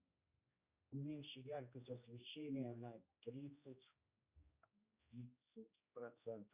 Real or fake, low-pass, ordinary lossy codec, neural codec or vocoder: fake; 3.6 kHz; Opus, 64 kbps; codec, 16 kHz, 1 kbps, X-Codec, HuBERT features, trained on general audio